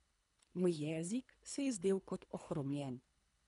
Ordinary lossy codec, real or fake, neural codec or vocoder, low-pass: none; fake; codec, 24 kHz, 3 kbps, HILCodec; 10.8 kHz